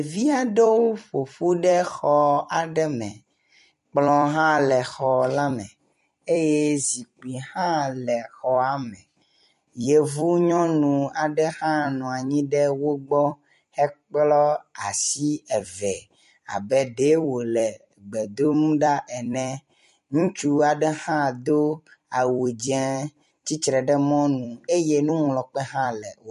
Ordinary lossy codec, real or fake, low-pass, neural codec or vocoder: MP3, 48 kbps; fake; 14.4 kHz; vocoder, 44.1 kHz, 128 mel bands every 256 samples, BigVGAN v2